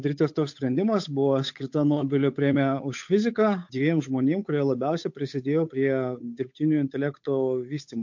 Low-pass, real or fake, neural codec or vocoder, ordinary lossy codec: 7.2 kHz; fake; codec, 16 kHz, 8 kbps, FunCodec, trained on Chinese and English, 25 frames a second; MP3, 48 kbps